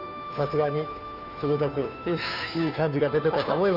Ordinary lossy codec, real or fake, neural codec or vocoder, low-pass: none; fake; codec, 16 kHz, 2 kbps, FunCodec, trained on Chinese and English, 25 frames a second; 5.4 kHz